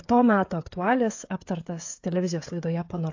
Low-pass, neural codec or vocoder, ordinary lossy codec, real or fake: 7.2 kHz; codec, 16 kHz, 16 kbps, FreqCodec, smaller model; AAC, 48 kbps; fake